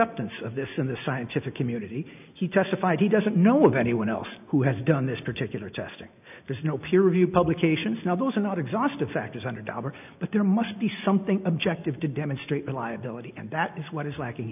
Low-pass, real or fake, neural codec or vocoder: 3.6 kHz; real; none